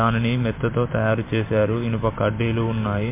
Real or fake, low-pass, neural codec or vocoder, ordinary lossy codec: fake; 3.6 kHz; vocoder, 44.1 kHz, 128 mel bands every 512 samples, BigVGAN v2; MP3, 24 kbps